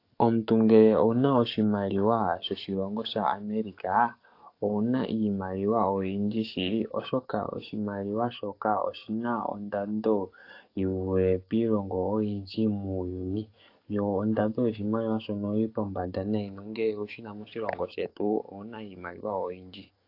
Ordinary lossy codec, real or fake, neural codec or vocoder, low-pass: AAC, 32 kbps; fake; codec, 44.1 kHz, 7.8 kbps, DAC; 5.4 kHz